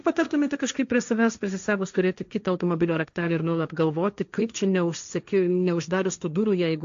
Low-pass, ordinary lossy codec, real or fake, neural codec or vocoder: 7.2 kHz; AAC, 64 kbps; fake; codec, 16 kHz, 1.1 kbps, Voila-Tokenizer